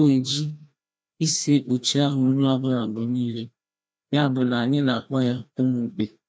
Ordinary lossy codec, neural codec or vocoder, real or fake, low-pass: none; codec, 16 kHz, 1 kbps, FreqCodec, larger model; fake; none